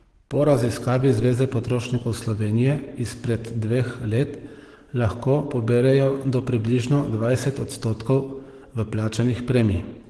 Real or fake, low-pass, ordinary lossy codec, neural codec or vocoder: fake; 10.8 kHz; Opus, 16 kbps; codec, 44.1 kHz, 7.8 kbps, DAC